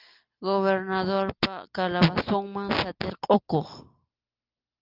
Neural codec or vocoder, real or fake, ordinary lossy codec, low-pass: none; real; Opus, 32 kbps; 5.4 kHz